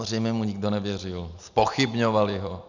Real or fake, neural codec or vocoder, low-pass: real; none; 7.2 kHz